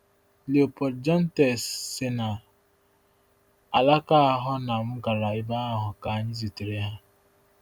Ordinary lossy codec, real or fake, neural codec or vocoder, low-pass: none; real; none; none